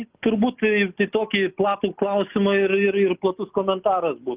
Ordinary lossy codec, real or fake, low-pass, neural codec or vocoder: Opus, 24 kbps; real; 3.6 kHz; none